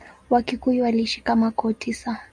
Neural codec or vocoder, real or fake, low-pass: none; real; 9.9 kHz